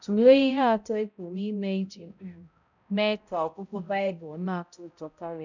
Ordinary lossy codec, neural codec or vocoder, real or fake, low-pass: none; codec, 16 kHz, 0.5 kbps, X-Codec, HuBERT features, trained on balanced general audio; fake; 7.2 kHz